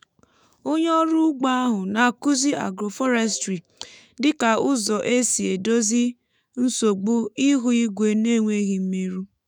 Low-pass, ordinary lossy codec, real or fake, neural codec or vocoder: none; none; fake; autoencoder, 48 kHz, 128 numbers a frame, DAC-VAE, trained on Japanese speech